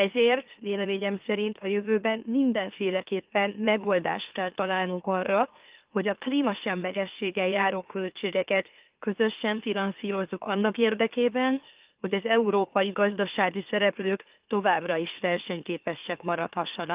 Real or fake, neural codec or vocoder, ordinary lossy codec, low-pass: fake; autoencoder, 44.1 kHz, a latent of 192 numbers a frame, MeloTTS; Opus, 32 kbps; 3.6 kHz